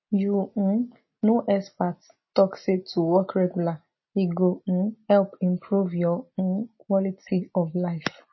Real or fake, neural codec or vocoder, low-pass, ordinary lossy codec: real; none; 7.2 kHz; MP3, 24 kbps